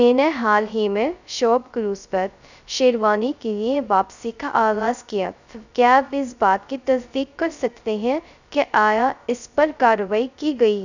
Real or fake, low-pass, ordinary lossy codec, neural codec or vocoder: fake; 7.2 kHz; none; codec, 16 kHz, 0.2 kbps, FocalCodec